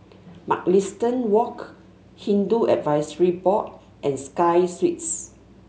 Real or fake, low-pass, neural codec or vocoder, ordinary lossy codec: real; none; none; none